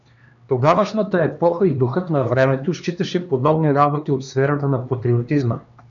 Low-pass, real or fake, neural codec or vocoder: 7.2 kHz; fake; codec, 16 kHz, 2 kbps, X-Codec, HuBERT features, trained on LibriSpeech